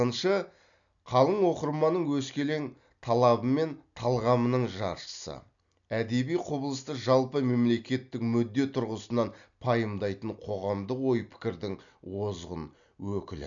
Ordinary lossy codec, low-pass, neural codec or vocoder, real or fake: none; 7.2 kHz; none; real